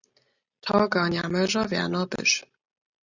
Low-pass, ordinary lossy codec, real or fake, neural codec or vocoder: 7.2 kHz; Opus, 64 kbps; real; none